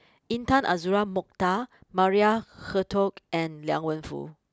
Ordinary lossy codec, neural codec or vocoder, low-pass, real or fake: none; none; none; real